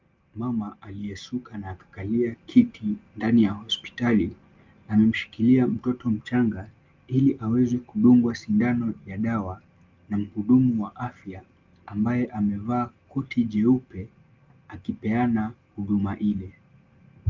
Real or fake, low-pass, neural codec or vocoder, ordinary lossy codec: real; 7.2 kHz; none; Opus, 24 kbps